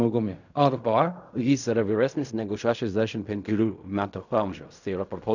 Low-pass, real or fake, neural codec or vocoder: 7.2 kHz; fake; codec, 16 kHz in and 24 kHz out, 0.4 kbps, LongCat-Audio-Codec, fine tuned four codebook decoder